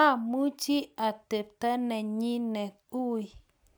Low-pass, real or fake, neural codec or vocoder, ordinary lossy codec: none; real; none; none